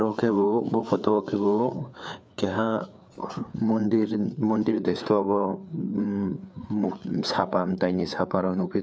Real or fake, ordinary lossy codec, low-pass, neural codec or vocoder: fake; none; none; codec, 16 kHz, 4 kbps, FreqCodec, larger model